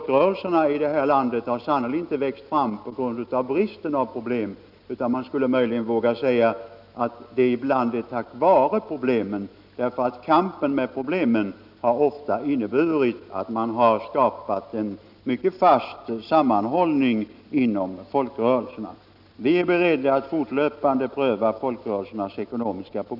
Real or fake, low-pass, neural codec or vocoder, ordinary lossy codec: real; 5.4 kHz; none; none